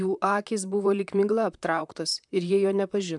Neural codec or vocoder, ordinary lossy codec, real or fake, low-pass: vocoder, 44.1 kHz, 128 mel bands, Pupu-Vocoder; MP3, 96 kbps; fake; 10.8 kHz